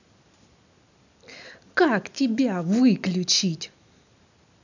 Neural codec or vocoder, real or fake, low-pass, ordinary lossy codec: none; real; 7.2 kHz; none